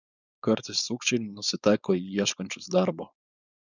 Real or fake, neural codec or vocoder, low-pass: fake; codec, 16 kHz, 4.8 kbps, FACodec; 7.2 kHz